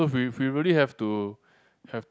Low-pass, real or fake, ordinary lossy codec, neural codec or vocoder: none; real; none; none